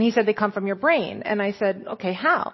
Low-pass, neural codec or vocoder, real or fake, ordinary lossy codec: 7.2 kHz; none; real; MP3, 24 kbps